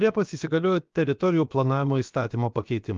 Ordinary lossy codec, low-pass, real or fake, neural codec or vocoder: Opus, 32 kbps; 7.2 kHz; fake; codec, 16 kHz, about 1 kbps, DyCAST, with the encoder's durations